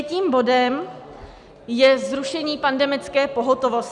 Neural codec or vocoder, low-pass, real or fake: none; 10.8 kHz; real